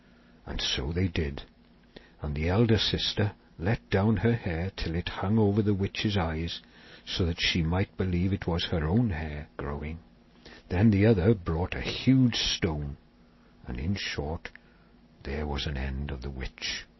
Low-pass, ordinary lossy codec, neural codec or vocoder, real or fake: 7.2 kHz; MP3, 24 kbps; none; real